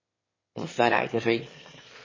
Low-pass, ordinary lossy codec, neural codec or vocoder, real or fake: 7.2 kHz; MP3, 32 kbps; autoencoder, 22.05 kHz, a latent of 192 numbers a frame, VITS, trained on one speaker; fake